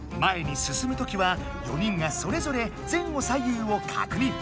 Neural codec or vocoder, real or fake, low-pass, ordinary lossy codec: none; real; none; none